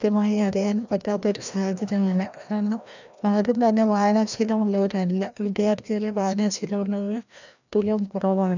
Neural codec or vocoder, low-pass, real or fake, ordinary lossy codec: codec, 16 kHz, 1 kbps, FreqCodec, larger model; 7.2 kHz; fake; none